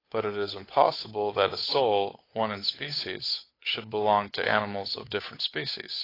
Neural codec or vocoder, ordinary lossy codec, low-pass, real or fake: codec, 16 kHz, 8 kbps, FunCodec, trained on Chinese and English, 25 frames a second; AAC, 24 kbps; 5.4 kHz; fake